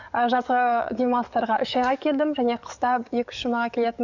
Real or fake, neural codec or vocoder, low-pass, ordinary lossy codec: fake; codec, 44.1 kHz, 7.8 kbps, DAC; 7.2 kHz; none